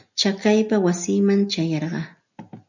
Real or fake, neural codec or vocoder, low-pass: real; none; 7.2 kHz